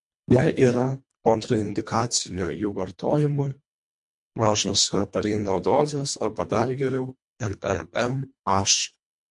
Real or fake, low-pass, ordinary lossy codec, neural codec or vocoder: fake; 10.8 kHz; MP3, 64 kbps; codec, 24 kHz, 1.5 kbps, HILCodec